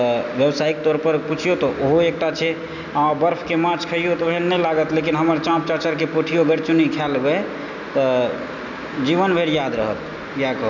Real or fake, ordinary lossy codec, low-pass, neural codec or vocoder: real; none; 7.2 kHz; none